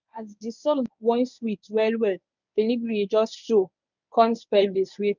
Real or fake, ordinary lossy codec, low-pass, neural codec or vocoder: fake; none; 7.2 kHz; codec, 24 kHz, 0.9 kbps, WavTokenizer, medium speech release version 1